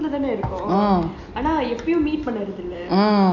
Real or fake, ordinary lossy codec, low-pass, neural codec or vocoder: real; none; 7.2 kHz; none